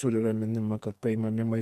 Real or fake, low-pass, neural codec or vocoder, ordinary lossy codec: fake; 14.4 kHz; codec, 44.1 kHz, 2.6 kbps, SNAC; MP3, 64 kbps